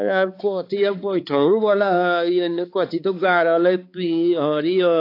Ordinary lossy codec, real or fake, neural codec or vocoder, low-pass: AAC, 32 kbps; fake; codec, 16 kHz, 4 kbps, X-Codec, HuBERT features, trained on balanced general audio; 5.4 kHz